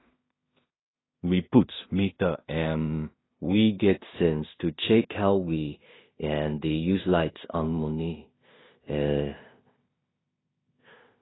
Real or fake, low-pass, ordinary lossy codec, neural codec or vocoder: fake; 7.2 kHz; AAC, 16 kbps; codec, 16 kHz in and 24 kHz out, 0.4 kbps, LongCat-Audio-Codec, two codebook decoder